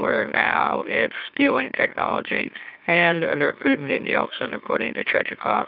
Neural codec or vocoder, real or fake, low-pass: autoencoder, 44.1 kHz, a latent of 192 numbers a frame, MeloTTS; fake; 5.4 kHz